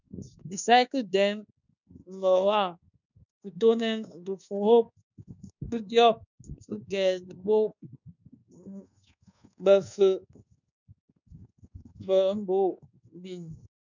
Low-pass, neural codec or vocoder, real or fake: 7.2 kHz; codec, 24 kHz, 1.2 kbps, DualCodec; fake